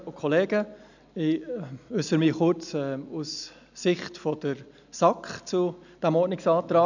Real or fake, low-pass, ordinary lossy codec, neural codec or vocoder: real; 7.2 kHz; none; none